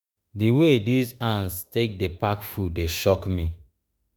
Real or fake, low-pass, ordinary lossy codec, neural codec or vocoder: fake; none; none; autoencoder, 48 kHz, 32 numbers a frame, DAC-VAE, trained on Japanese speech